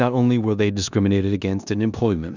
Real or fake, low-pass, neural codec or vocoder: fake; 7.2 kHz; codec, 16 kHz in and 24 kHz out, 0.9 kbps, LongCat-Audio-Codec, four codebook decoder